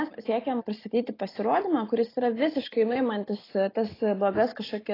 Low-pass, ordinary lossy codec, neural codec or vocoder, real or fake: 5.4 kHz; AAC, 24 kbps; none; real